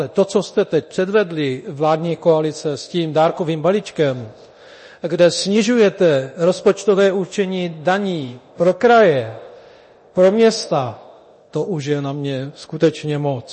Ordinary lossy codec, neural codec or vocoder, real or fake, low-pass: MP3, 32 kbps; codec, 24 kHz, 0.9 kbps, DualCodec; fake; 10.8 kHz